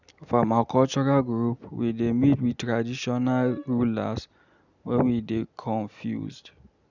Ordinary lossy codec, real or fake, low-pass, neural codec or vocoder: none; real; 7.2 kHz; none